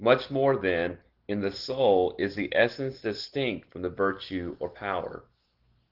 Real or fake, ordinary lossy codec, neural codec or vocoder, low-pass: real; Opus, 16 kbps; none; 5.4 kHz